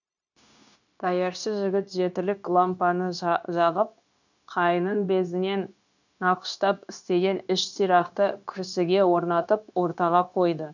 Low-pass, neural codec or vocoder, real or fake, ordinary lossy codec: 7.2 kHz; codec, 16 kHz, 0.9 kbps, LongCat-Audio-Codec; fake; none